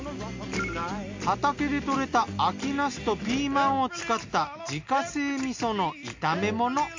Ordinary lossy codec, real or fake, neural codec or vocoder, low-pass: MP3, 48 kbps; real; none; 7.2 kHz